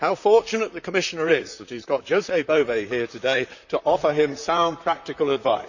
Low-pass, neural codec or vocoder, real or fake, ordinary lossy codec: 7.2 kHz; vocoder, 44.1 kHz, 128 mel bands, Pupu-Vocoder; fake; none